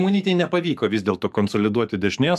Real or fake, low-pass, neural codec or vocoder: fake; 14.4 kHz; codec, 44.1 kHz, 7.8 kbps, DAC